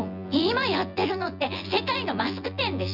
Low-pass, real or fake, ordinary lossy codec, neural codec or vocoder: 5.4 kHz; fake; none; vocoder, 24 kHz, 100 mel bands, Vocos